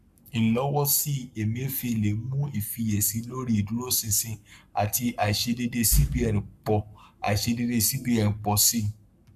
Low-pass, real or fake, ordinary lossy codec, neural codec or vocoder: 14.4 kHz; fake; none; codec, 44.1 kHz, 7.8 kbps, Pupu-Codec